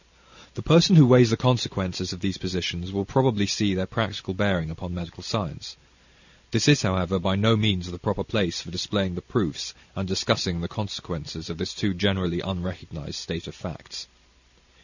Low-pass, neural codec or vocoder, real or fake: 7.2 kHz; none; real